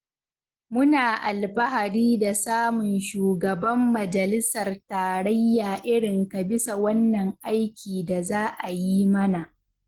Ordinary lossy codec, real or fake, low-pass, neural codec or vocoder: Opus, 16 kbps; real; 19.8 kHz; none